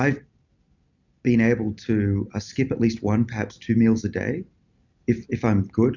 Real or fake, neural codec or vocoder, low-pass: real; none; 7.2 kHz